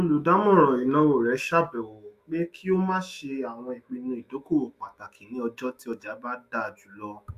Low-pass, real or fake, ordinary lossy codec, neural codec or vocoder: 14.4 kHz; fake; Opus, 64 kbps; autoencoder, 48 kHz, 128 numbers a frame, DAC-VAE, trained on Japanese speech